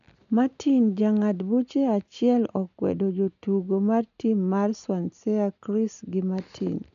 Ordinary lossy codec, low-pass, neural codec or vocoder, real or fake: none; 7.2 kHz; none; real